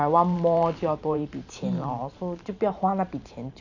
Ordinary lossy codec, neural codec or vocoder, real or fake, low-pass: none; vocoder, 44.1 kHz, 128 mel bands every 512 samples, BigVGAN v2; fake; 7.2 kHz